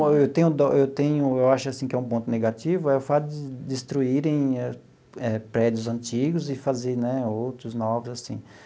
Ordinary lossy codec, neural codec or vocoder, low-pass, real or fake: none; none; none; real